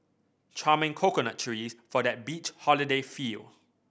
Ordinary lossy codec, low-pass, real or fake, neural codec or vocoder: none; none; real; none